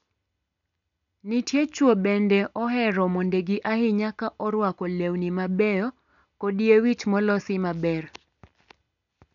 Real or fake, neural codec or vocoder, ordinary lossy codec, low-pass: real; none; none; 7.2 kHz